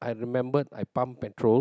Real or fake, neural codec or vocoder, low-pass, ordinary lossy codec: real; none; none; none